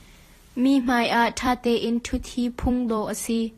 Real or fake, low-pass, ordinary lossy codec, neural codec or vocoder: fake; 14.4 kHz; AAC, 48 kbps; vocoder, 44.1 kHz, 128 mel bands every 256 samples, BigVGAN v2